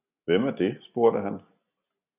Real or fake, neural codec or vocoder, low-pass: real; none; 3.6 kHz